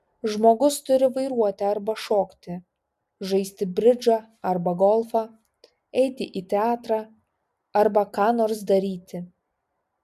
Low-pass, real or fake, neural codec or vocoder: 14.4 kHz; real; none